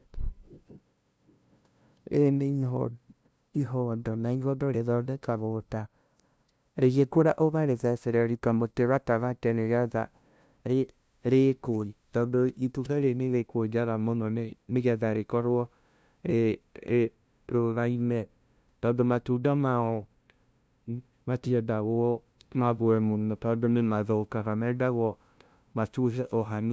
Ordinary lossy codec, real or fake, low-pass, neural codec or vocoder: none; fake; none; codec, 16 kHz, 0.5 kbps, FunCodec, trained on LibriTTS, 25 frames a second